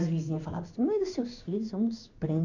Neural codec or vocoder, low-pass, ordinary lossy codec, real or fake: codec, 16 kHz in and 24 kHz out, 1 kbps, XY-Tokenizer; 7.2 kHz; none; fake